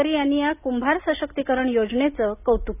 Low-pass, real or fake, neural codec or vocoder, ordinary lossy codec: 3.6 kHz; real; none; none